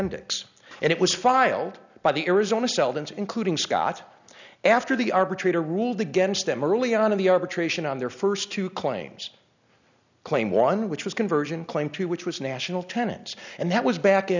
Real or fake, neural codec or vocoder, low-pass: real; none; 7.2 kHz